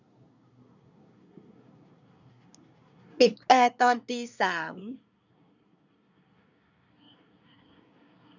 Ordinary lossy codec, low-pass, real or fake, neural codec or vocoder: none; 7.2 kHz; fake; codec, 24 kHz, 1 kbps, SNAC